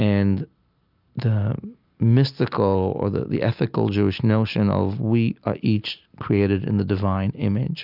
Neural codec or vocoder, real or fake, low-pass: none; real; 5.4 kHz